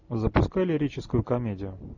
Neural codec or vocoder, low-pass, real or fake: none; 7.2 kHz; real